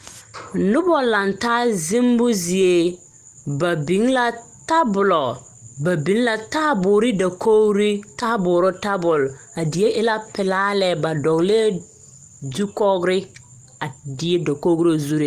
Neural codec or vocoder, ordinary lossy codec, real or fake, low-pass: none; Opus, 24 kbps; real; 14.4 kHz